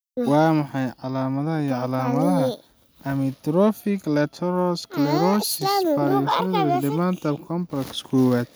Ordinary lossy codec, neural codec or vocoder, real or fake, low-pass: none; none; real; none